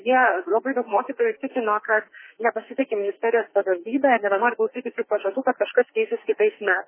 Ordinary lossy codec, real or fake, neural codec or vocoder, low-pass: MP3, 16 kbps; fake; codec, 44.1 kHz, 2.6 kbps, SNAC; 3.6 kHz